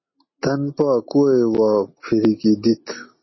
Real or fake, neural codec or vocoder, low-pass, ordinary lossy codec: real; none; 7.2 kHz; MP3, 24 kbps